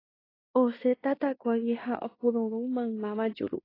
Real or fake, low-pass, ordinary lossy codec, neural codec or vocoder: fake; 5.4 kHz; AAC, 24 kbps; codec, 16 kHz in and 24 kHz out, 0.9 kbps, LongCat-Audio-Codec, fine tuned four codebook decoder